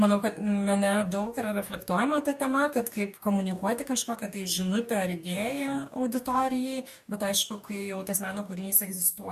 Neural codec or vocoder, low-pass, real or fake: codec, 44.1 kHz, 2.6 kbps, DAC; 14.4 kHz; fake